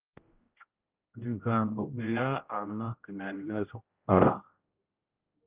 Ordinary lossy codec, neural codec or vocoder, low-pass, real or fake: Opus, 24 kbps; codec, 16 kHz, 0.5 kbps, X-Codec, HuBERT features, trained on general audio; 3.6 kHz; fake